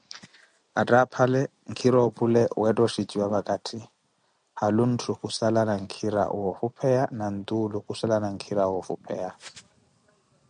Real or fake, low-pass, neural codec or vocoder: real; 9.9 kHz; none